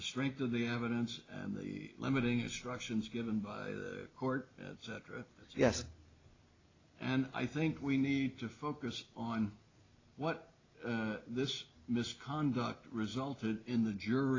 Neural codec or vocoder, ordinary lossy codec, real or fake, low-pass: none; AAC, 32 kbps; real; 7.2 kHz